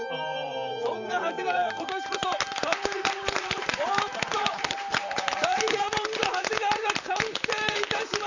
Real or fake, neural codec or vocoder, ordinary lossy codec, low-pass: fake; vocoder, 44.1 kHz, 128 mel bands, Pupu-Vocoder; none; 7.2 kHz